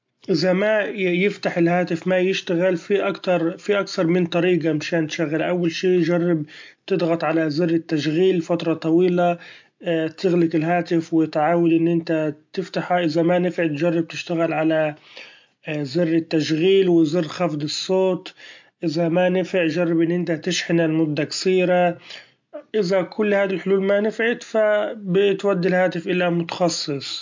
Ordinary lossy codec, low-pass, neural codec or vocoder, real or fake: MP3, 48 kbps; 7.2 kHz; none; real